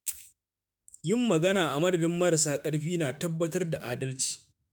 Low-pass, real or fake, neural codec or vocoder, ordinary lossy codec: none; fake; autoencoder, 48 kHz, 32 numbers a frame, DAC-VAE, trained on Japanese speech; none